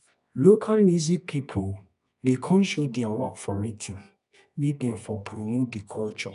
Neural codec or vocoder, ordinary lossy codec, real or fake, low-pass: codec, 24 kHz, 0.9 kbps, WavTokenizer, medium music audio release; none; fake; 10.8 kHz